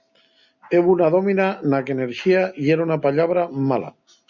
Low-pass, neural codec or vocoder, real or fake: 7.2 kHz; none; real